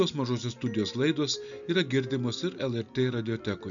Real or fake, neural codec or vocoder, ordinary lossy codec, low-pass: real; none; MP3, 96 kbps; 7.2 kHz